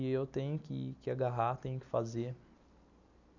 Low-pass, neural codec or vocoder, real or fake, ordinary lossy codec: 7.2 kHz; none; real; none